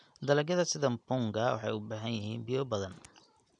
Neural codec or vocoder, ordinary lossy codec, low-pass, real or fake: none; none; 9.9 kHz; real